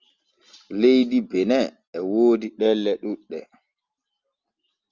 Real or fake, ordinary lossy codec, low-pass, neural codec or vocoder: real; Opus, 32 kbps; 7.2 kHz; none